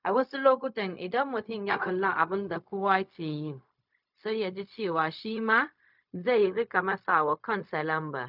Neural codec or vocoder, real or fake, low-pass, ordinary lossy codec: codec, 16 kHz, 0.4 kbps, LongCat-Audio-Codec; fake; 5.4 kHz; none